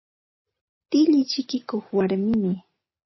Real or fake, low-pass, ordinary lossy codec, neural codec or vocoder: real; 7.2 kHz; MP3, 24 kbps; none